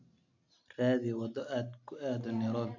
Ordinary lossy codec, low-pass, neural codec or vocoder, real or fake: none; 7.2 kHz; none; real